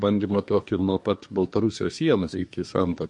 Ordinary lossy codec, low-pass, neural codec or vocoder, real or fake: MP3, 48 kbps; 10.8 kHz; codec, 24 kHz, 1 kbps, SNAC; fake